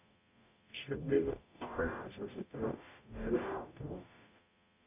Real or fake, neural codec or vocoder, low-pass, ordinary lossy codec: fake; codec, 44.1 kHz, 0.9 kbps, DAC; 3.6 kHz; none